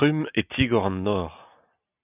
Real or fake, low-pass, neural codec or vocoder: real; 3.6 kHz; none